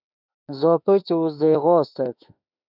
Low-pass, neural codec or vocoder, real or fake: 5.4 kHz; autoencoder, 48 kHz, 32 numbers a frame, DAC-VAE, trained on Japanese speech; fake